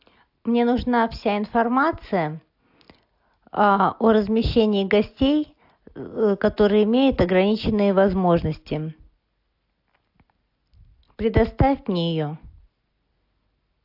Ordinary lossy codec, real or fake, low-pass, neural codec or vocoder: AAC, 48 kbps; real; 5.4 kHz; none